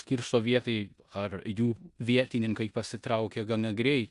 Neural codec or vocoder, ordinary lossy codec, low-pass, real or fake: codec, 16 kHz in and 24 kHz out, 0.9 kbps, LongCat-Audio-Codec, four codebook decoder; Opus, 64 kbps; 10.8 kHz; fake